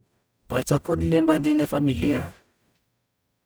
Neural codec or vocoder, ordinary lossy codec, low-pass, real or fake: codec, 44.1 kHz, 0.9 kbps, DAC; none; none; fake